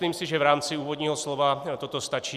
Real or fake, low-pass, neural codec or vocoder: real; 14.4 kHz; none